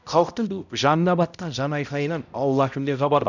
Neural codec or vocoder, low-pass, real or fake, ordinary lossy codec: codec, 16 kHz, 0.5 kbps, X-Codec, HuBERT features, trained on balanced general audio; 7.2 kHz; fake; none